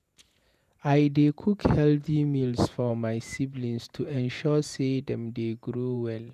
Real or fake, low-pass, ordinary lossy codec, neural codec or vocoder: fake; 14.4 kHz; none; vocoder, 48 kHz, 128 mel bands, Vocos